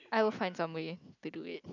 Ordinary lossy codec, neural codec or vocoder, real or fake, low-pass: none; none; real; 7.2 kHz